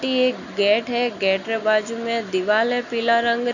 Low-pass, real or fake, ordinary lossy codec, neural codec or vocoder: 7.2 kHz; real; none; none